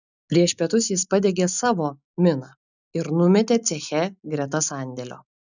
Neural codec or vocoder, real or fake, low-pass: none; real; 7.2 kHz